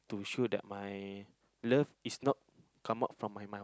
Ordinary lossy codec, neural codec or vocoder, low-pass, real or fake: none; none; none; real